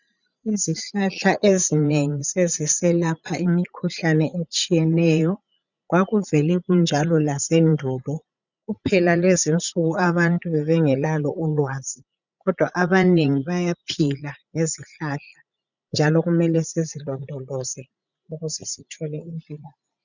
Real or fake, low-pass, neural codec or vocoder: fake; 7.2 kHz; vocoder, 44.1 kHz, 80 mel bands, Vocos